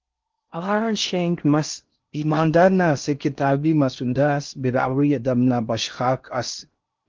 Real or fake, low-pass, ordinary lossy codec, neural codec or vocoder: fake; 7.2 kHz; Opus, 24 kbps; codec, 16 kHz in and 24 kHz out, 0.6 kbps, FocalCodec, streaming, 4096 codes